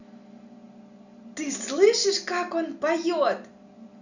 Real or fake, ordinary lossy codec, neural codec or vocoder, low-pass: real; none; none; 7.2 kHz